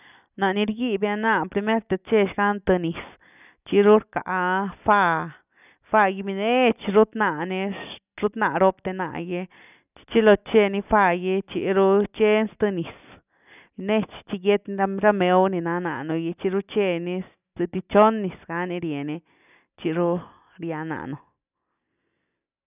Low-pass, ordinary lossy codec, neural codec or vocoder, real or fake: 3.6 kHz; none; none; real